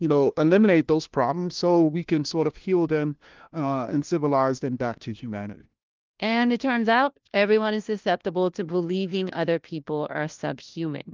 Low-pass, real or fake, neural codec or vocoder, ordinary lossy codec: 7.2 kHz; fake; codec, 16 kHz, 1 kbps, FunCodec, trained on LibriTTS, 50 frames a second; Opus, 32 kbps